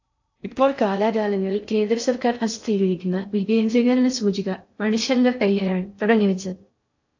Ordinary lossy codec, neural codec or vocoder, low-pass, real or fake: AAC, 48 kbps; codec, 16 kHz in and 24 kHz out, 0.6 kbps, FocalCodec, streaming, 2048 codes; 7.2 kHz; fake